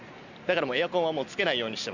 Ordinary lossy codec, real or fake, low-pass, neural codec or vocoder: none; real; 7.2 kHz; none